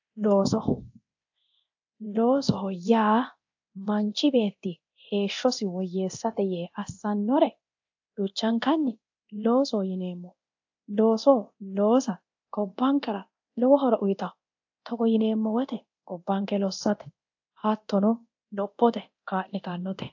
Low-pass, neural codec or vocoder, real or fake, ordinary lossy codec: 7.2 kHz; codec, 24 kHz, 0.9 kbps, DualCodec; fake; AAC, 48 kbps